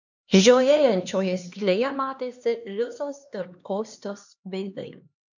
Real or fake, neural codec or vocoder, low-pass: fake; codec, 16 kHz, 2 kbps, X-Codec, HuBERT features, trained on LibriSpeech; 7.2 kHz